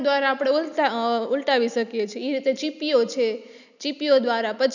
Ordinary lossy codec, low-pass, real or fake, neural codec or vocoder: none; 7.2 kHz; real; none